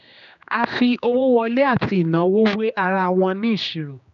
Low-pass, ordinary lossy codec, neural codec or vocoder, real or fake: 7.2 kHz; none; codec, 16 kHz, 2 kbps, X-Codec, HuBERT features, trained on general audio; fake